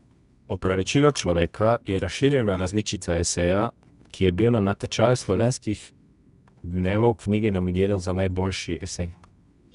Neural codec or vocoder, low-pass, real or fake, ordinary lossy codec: codec, 24 kHz, 0.9 kbps, WavTokenizer, medium music audio release; 10.8 kHz; fake; none